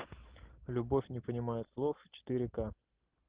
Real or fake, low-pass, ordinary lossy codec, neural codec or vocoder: real; 3.6 kHz; Opus, 16 kbps; none